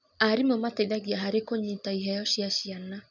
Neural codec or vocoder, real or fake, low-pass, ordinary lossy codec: none; real; 7.2 kHz; none